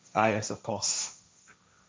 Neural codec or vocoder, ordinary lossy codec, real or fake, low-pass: codec, 16 kHz, 1.1 kbps, Voila-Tokenizer; none; fake; none